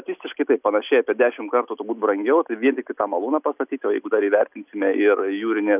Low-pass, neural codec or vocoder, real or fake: 3.6 kHz; none; real